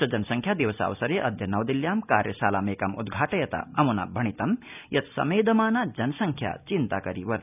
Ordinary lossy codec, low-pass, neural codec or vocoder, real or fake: none; 3.6 kHz; none; real